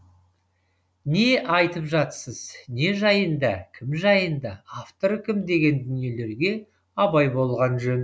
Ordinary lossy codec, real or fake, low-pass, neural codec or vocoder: none; real; none; none